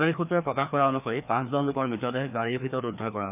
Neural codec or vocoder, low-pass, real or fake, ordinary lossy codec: codec, 16 kHz, 2 kbps, FreqCodec, larger model; 3.6 kHz; fake; none